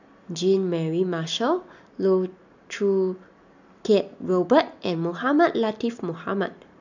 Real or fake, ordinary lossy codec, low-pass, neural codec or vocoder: real; none; 7.2 kHz; none